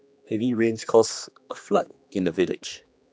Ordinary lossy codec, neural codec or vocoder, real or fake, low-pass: none; codec, 16 kHz, 2 kbps, X-Codec, HuBERT features, trained on general audio; fake; none